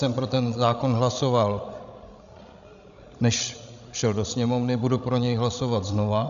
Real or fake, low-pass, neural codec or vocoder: fake; 7.2 kHz; codec, 16 kHz, 16 kbps, FreqCodec, larger model